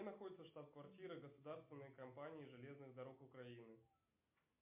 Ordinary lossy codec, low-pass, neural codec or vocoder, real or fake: MP3, 32 kbps; 3.6 kHz; none; real